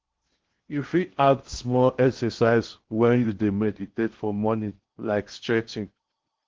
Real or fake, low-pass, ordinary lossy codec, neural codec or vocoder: fake; 7.2 kHz; Opus, 16 kbps; codec, 16 kHz in and 24 kHz out, 0.6 kbps, FocalCodec, streaming, 2048 codes